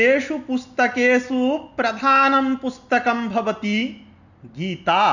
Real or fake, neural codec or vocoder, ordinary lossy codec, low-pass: real; none; none; 7.2 kHz